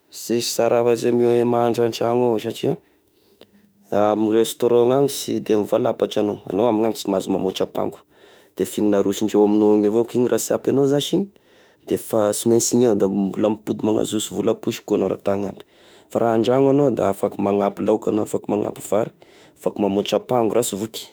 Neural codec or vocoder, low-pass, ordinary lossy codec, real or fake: autoencoder, 48 kHz, 32 numbers a frame, DAC-VAE, trained on Japanese speech; none; none; fake